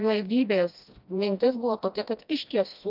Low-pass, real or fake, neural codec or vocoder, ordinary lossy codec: 5.4 kHz; fake; codec, 16 kHz, 1 kbps, FreqCodec, smaller model; AAC, 48 kbps